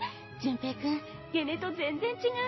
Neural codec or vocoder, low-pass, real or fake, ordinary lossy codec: none; 7.2 kHz; real; MP3, 24 kbps